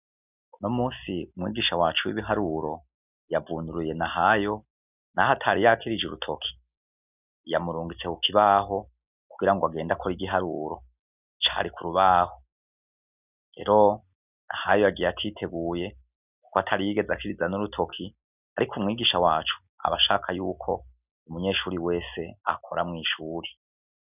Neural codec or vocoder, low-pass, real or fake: none; 3.6 kHz; real